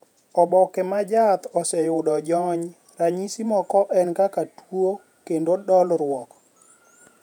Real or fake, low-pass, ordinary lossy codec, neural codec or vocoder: fake; 19.8 kHz; none; vocoder, 48 kHz, 128 mel bands, Vocos